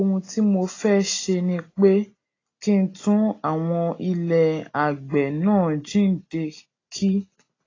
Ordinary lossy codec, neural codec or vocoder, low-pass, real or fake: AAC, 32 kbps; none; 7.2 kHz; real